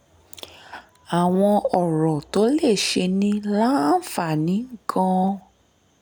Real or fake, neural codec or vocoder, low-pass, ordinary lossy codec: real; none; none; none